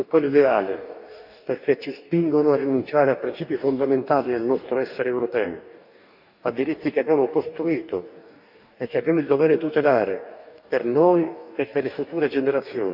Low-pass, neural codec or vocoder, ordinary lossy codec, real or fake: 5.4 kHz; codec, 44.1 kHz, 2.6 kbps, DAC; none; fake